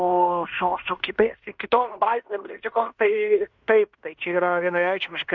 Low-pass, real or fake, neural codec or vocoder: 7.2 kHz; fake; codec, 16 kHz in and 24 kHz out, 0.9 kbps, LongCat-Audio-Codec, fine tuned four codebook decoder